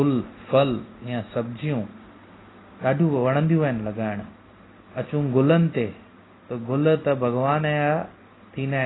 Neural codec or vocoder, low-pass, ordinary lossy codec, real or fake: none; 7.2 kHz; AAC, 16 kbps; real